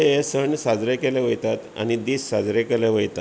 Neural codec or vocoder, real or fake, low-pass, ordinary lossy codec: none; real; none; none